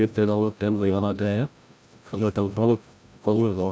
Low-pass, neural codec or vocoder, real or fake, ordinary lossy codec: none; codec, 16 kHz, 0.5 kbps, FreqCodec, larger model; fake; none